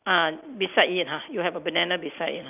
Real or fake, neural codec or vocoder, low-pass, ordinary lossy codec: real; none; 3.6 kHz; none